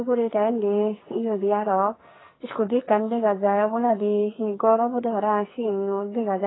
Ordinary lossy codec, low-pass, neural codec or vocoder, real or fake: AAC, 16 kbps; 7.2 kHz; codec, 44.1 kHz, 2.6 kbps, SNAC; fake